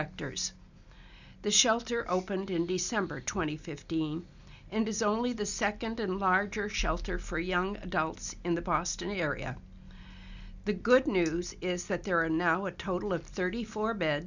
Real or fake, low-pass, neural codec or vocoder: real; 7.2 kHz; none